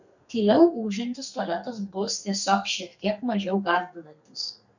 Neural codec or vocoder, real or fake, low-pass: codec, 32 kHz, 1.9 kbps, SNAC; fake; 7.2 kHz